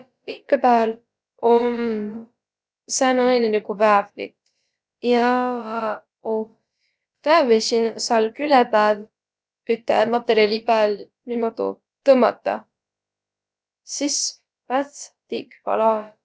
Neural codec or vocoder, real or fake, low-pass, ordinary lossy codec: codec, 16 kHz, about 1 kbps, DyCAST, with the encoder's durations; fake; none; none